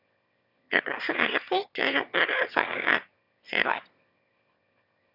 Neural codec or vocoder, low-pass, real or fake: autoencoder, 22.05 kHz, a latent of 192 numbers a frame, VITS, trained on one speaker; 5.4 kHz; fake